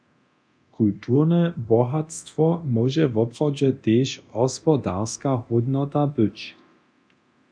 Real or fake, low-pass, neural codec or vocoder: fake; 9.9 kHz; codec, 24 kHz, 0.9 kbps, DualCodec